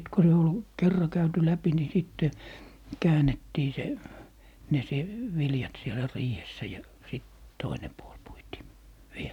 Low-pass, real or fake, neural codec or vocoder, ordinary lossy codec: 19.8 kHz; real; none; none